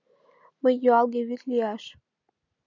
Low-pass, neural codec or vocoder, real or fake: 7.2 kHz; none; real